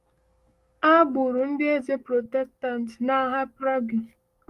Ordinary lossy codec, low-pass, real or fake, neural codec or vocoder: Opus, 32 kbps; 14.4 kHz; fake; codec, 44.1 kHz, 7.8 kbps, DAC